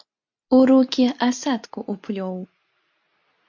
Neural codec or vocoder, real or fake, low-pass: none; real; 7.2 kHz